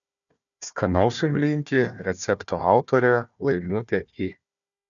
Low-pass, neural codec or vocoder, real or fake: 7.2 kHz; codec, 16 kHz, 1 kbps, FunCodec, trained on Chinese and English, 50 frames a second; fake